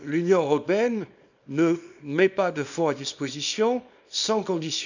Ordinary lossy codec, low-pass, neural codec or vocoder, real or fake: none; 7.2 kHz; codec, 24 kHz, 0.9 kbps, WavTokenizer, small release; fake